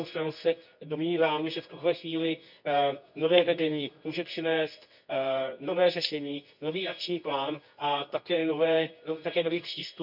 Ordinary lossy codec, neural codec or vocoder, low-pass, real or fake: none; codec, 24 kHz, 0.9 kbps, WavTokenizer, medium music audio release; 5.4 kHz; fake